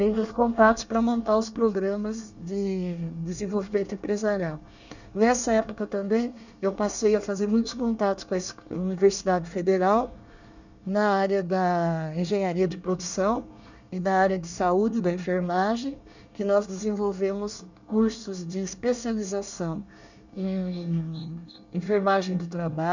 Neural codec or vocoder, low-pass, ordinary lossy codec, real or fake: codec, 24 kHz, 1 kbps, SNAC; 7.2 kHz; none; fake